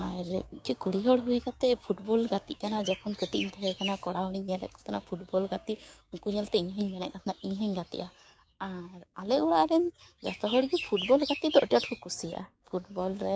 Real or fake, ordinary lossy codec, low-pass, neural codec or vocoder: fake; none; none; codec, 16 kHz, 6 kbps, DAC